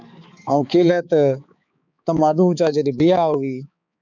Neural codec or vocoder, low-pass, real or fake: codec, 16 kHz, 4 kbps, X-Codec, HuBERT features, trained on balanced general audio; 7.2 kHz; fake